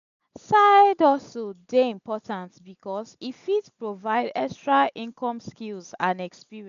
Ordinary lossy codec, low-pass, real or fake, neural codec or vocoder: none; 7.2 kHz; real; none